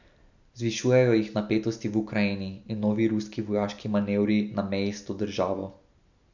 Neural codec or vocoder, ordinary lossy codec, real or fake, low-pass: none; none; real; 7.2 kHz